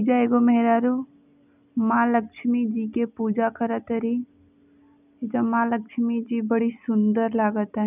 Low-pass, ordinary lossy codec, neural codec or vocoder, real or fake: 3.6 kHz; none; none; real